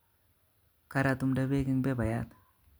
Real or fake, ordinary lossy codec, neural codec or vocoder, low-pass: real; none; none; none